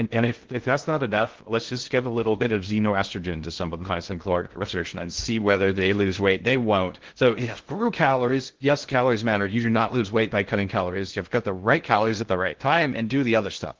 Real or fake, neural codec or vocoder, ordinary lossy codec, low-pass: fake; codec, 16 kHz in and 24 kHz out, 0.6 kbps, FocalCodec, streaming, 2048 codes; Opus, 16 kbps; 7.2 kHz